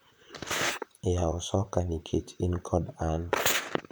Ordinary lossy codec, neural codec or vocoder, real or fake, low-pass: none; vocoder, 44.1 kHz, 128 mel bands, Pupu-Vocoder; fake; none